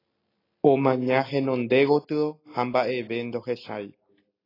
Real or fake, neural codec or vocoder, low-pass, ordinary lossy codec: real; none; 5.4 kHz; AAC, 24 kbps